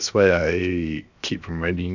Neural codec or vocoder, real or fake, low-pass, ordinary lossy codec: codec, 16 kHz, 0.7 kbps, FocalCodec; fake; 7.2 kHz; none